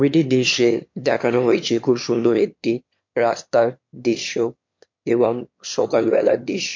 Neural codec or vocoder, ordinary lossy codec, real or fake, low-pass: autoencoder, 22.05 kHz, a latent of 192 numbers a frame, VITS, trained on one speaker; MP3, 48 kbps; fake; 7.2 kHz